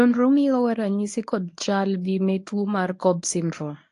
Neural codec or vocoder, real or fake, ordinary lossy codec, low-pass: codec, 24 kHz, 0.9 kbps, WavTokenizer, medium speech release version 1; fake; AAC, 48 kbps; 10.8 kHz